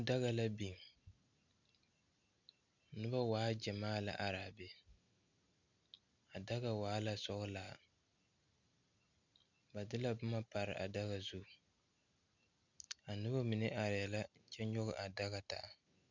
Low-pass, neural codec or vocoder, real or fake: 7.2 kHz; none; real